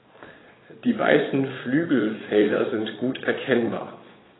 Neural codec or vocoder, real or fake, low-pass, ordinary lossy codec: vocoder, 22.05 kHz, 80 mel bands, Vocos; fake; 7.2 kHz; AAC, 16 kbps